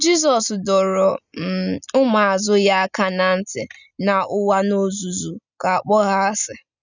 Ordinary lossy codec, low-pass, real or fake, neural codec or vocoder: none; 7.2 kHz; real; none